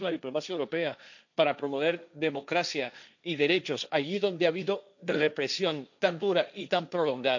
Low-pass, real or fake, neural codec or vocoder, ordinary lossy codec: 7.2 kHz; fake; codec, 16 kHz, 1.1 kbps, Voila-Tokenizer; none